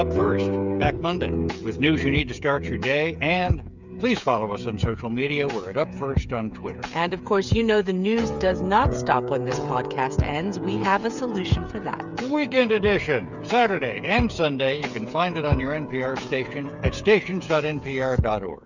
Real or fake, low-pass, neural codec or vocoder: fake; 7.2 kHz; codec, 16 kHz, 8 kbps, FreqCodec, smaller model